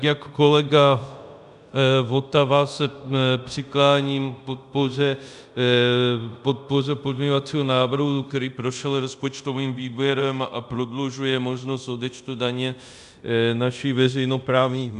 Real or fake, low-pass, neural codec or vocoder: fake; 10.8 kHz; codec, 24 kHz, 0.5 kbps, DualCodec